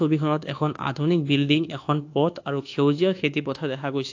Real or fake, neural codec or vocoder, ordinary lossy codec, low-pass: fake; codec, 24 kHz, 1.2 kbps, DualCodec; AAC, 48 kbps; 7.2 kHz